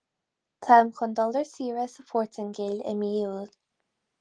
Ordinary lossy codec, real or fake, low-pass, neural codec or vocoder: Opus, 24 kbps; real; 9.9 kHz; none